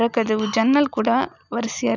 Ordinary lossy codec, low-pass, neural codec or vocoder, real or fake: none; 7.2 kHz; none; real